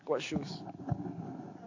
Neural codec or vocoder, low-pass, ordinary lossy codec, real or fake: none; 7.2 kHz; AAC, 48 kbps; real